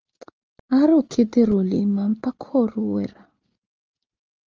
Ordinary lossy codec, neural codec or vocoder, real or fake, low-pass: Opus, 24 kbps; none; real; 7.2 kHz